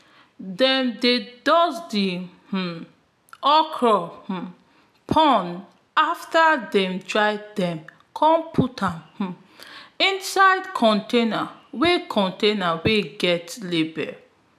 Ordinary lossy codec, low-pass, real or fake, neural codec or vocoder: none; 14.4 kHz; real; none